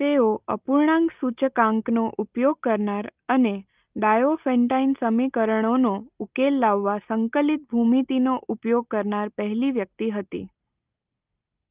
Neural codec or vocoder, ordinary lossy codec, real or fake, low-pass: none; Opus, 24 kbps; real; 3.6 kHz